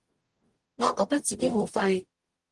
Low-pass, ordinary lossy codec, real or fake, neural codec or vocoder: 10.8 kHz; Opus, 24 kbps; fake; codec, 44.1 kHz, 0.9 kbps, DAC